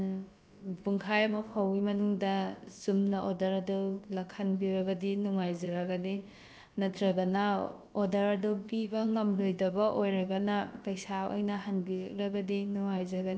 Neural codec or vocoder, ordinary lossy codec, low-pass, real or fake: codec, 16 kHz, about 1 kbps, DyCAST, with the encoder's durations; none; none; fake